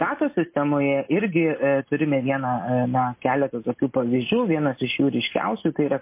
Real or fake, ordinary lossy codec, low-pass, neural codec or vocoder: real; MP3, 24 kbps; 3.6 kHz; none